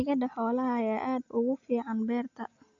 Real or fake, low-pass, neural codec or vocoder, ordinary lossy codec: real; 7.2 kHz; none; Opus, 64 kbps